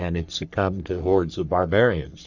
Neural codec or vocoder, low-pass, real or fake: codec, 44.1 kHz, 3.4 kbps, Pupu-Codec; 7.2 kHz; fake